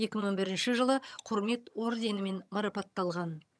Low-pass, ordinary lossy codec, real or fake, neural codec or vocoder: none; none; fake; vocoder, 22.05 kHz, 80 mel bands, HiFi-GAN